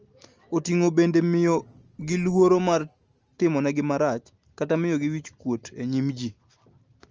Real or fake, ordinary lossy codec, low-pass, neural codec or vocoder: real; Opus, 24 kbps; 7.2 kHz; none